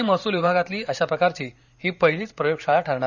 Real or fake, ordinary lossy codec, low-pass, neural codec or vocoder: fake; none; 7.2 kHz; vocoder, 44.1 kHz, 128 mel bands every 512 samples, BigVGAN v2